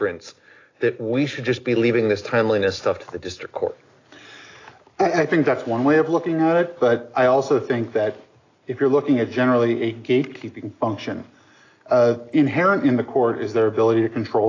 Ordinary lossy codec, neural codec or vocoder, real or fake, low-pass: AAC, 32 kbps; none; real; 7.2 kHz